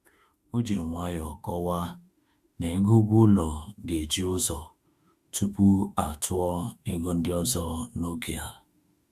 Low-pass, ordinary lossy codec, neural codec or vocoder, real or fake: 14.4 kHz; Opus, 64 kbps; autoencoder, 48 kHz, 32 numbers a frame, DAC-VAE, trained on Japanese speech; fake